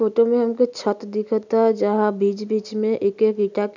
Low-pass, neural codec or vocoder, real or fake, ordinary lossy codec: 7.2 kHz; none; real; none